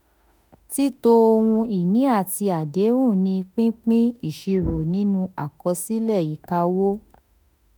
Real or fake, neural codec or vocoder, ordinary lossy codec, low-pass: fake; autoencoder, 48 kHz, 32 numbers a frame, DAC-VAE, trained on Japanese speech; none; none